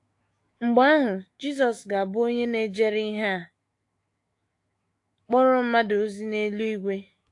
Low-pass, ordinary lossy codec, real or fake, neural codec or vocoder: 10.8 kHz; AAC, 48 kbps; fake; autoencoder, 48 kHz, 128 numbers a frame, DAC-VAE, trained on Japanese speech